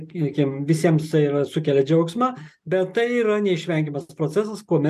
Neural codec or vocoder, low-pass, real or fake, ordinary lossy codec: none; 14.4 kHz; real; MP3, 96 kbps